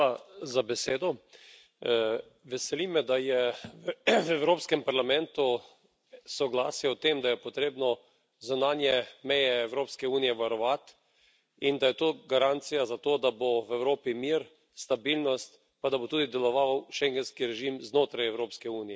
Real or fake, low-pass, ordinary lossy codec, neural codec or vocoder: real; none; none; none